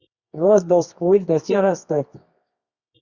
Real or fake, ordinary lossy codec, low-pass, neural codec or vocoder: fake; Opus, 64 kbps; 7.2 kHz; codec, 24 kHz, 0.9 kbps, WavTokenizer, medium music audio release